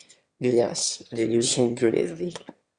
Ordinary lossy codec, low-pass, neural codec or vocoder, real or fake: Opus, 64 kbps; 9.9 kHz; autoencoder, 22.05 kHz, a latent of 192 numbers a frame, VITS, trained on one speaker; fake